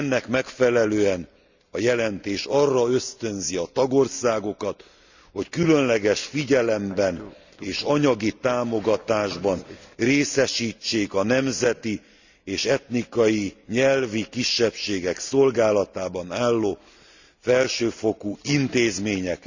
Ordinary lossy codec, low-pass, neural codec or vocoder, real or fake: Opus, 64 kbps; 7.2 kHz; none; real